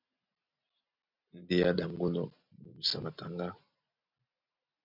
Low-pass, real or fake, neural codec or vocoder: 5.4 kHz; real; none